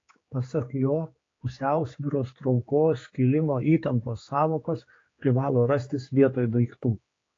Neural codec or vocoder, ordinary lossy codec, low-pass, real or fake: codec, 16 kHz, 4 kbps, X-Codec, HuBERT features, trained on general audio; AAC, 32 kbps; 7.2 kHz; fake